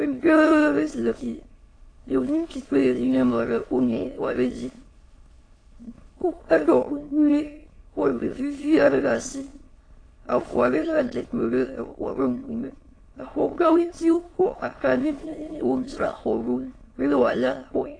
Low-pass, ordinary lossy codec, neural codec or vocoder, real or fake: 9.9 kHz; AAC, 32 kbps; autoencoder, 22.05 kHz, a latent of 192 numbers a frame, VITS, trained on many speakers; fake